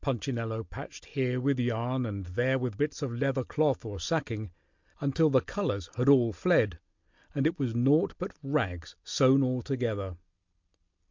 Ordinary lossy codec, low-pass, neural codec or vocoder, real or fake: MP3, 64 kbps; 7.2 kHz; none; real